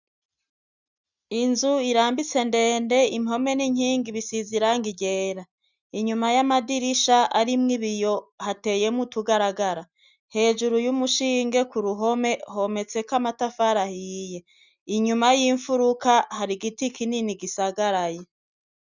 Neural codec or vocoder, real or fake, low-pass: none; real; 7.2 kHz